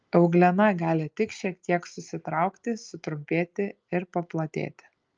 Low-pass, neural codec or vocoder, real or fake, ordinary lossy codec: 7.2 kHz; none; real; Opus, 24 kbps